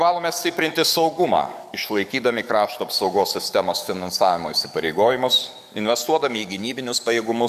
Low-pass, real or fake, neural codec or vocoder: 14.4 kHz; fake; codec, 44.1 kHz, 7.8 kbps, DAC